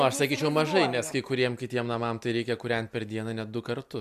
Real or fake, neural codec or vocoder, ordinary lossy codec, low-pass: real; none; MP3, 96 kbps; 14.4 kHz